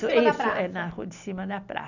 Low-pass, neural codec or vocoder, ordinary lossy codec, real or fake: 7.2 kHz; none; none; real